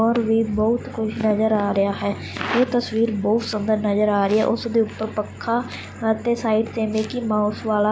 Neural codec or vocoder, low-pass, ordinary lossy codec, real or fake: none; none; none; real